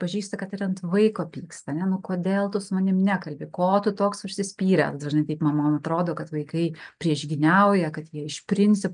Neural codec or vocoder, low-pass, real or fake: none; 9.9 kHz; real